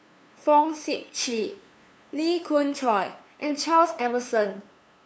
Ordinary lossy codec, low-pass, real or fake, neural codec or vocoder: none; none; fake; codec, 16 kHz, 2 kbps, FunCodec, trained on LibriTTS, 25 frames a second